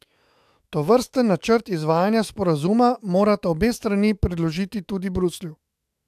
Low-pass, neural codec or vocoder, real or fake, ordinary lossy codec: 14.4 kHz; autoencoder, 48 kHz, 128 numbers a frame, DAC-VAE, trained on Japanese speech; fake; AAC, 96 kbps